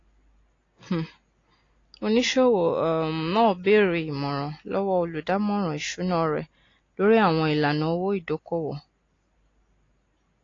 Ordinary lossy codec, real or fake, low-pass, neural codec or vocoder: AAC, 32 kbps; real; 7.2 kHz; none